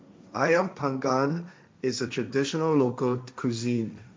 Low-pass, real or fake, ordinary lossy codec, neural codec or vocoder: none; fake; none; codec, 16 kHz, 1.1 kbps, Voila-Tokenizer